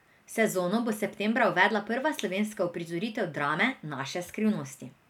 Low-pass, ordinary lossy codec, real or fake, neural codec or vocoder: 19.8 kHz; none; real; none